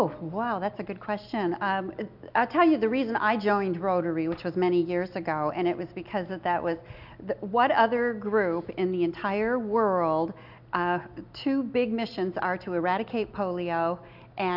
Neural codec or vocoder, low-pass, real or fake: none; 5.4 kHz; real